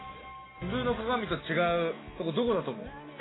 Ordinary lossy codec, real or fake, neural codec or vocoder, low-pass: AAC, 16 kbps; real; none; 7.2 kHz